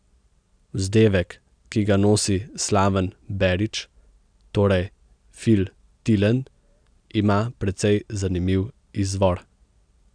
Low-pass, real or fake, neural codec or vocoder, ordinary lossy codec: 9.9 kHz; real; none; none